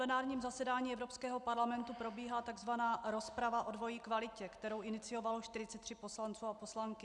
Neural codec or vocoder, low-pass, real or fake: none; 10.8 kHz; real